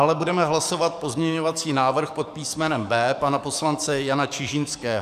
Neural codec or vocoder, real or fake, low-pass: codec, 44.1 kHz, 7.8 kbps, DAC; fake; 14.4 kHz